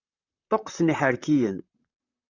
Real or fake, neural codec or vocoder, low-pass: fake; vocoder, 44.1 kHz, 128 mel bands, Pupu-Vocoder; 7.2 kHz